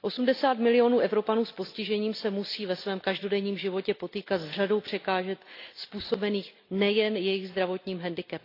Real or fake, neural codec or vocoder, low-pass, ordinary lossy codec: real; none; 5.4 kHz; AAC, 32 kbps